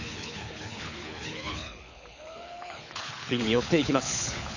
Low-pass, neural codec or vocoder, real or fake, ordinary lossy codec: 7.2 kHz; codec, 24 kHz, 6 kbps, HILCodec; fake; none